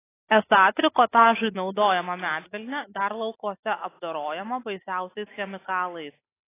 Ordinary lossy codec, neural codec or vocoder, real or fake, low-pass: AAC, 24 kbps; none; real; 3.6 kHz